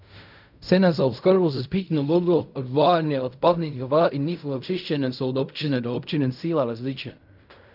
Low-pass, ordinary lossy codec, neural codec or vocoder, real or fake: 5.4 kHz; none; codec, 16 kHz in and 24 kHz out, 0.4 kbps, LongCat-Audio-Codec, fine tuned four codebook decoder; fake